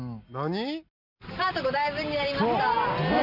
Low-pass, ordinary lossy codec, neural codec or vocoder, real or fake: 5.4 kHz; none; none; real